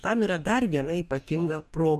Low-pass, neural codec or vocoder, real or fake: 14.4 kHz; codec, 44.1 kHz, 2.6 kbps, DAC; fake